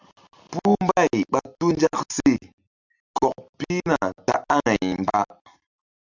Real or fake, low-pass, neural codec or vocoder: real; 7.2 kHz; none